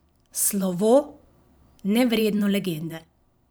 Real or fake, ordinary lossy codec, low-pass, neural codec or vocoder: fake; none; none; vocoder, 44.1 kHz, 128 mel bands every 512 samples, BigVGAN v2